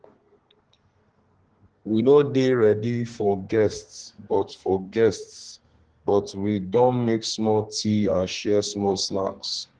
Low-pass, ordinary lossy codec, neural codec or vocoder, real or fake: 9.9 kHz; Opus, 16 kbps; codec, 32 kHz, 1.9 kbps, SNAC; fake